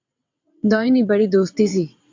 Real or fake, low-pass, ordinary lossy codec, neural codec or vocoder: fake; 7.2 kHz; MP3, 48 kbps; vocoder, 22.05 kHz, 80 mel bands, WaveNeXt